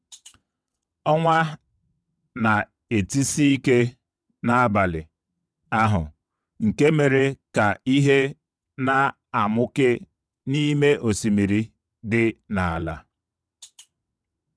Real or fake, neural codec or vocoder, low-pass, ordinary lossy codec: fake; vocoder, 22.05 kHz, 80 mel bands, WaveNeXt; none; none